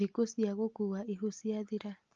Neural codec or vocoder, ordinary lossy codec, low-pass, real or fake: none; Opus, 24 kbps; 7.2 kHz; real